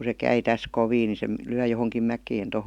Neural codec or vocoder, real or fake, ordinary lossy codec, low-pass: none; real; none; 19.8 kHz